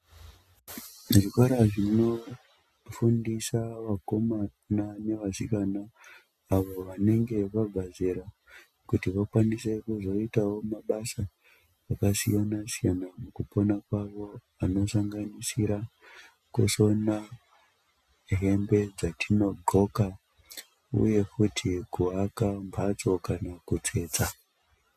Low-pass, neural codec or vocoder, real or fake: 14.4 kHz; none; real